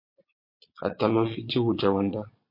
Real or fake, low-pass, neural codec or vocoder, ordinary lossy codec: fake; 5.4 kHz; vocoder, 22.05 kHz, 80 mel bands, Vocos; MP3, 32 kbps